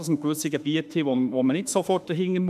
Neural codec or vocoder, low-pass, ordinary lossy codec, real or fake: autoencoder, 48 kHz, 32 numbers a frame, DAC-VAE, trained on Japanese speech; 14.4 kHz; none; fake